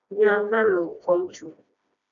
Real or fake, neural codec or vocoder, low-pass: fake; codec, 16 kHz, 1 kbps, FreqCodec, smaller model; 7.2 kHz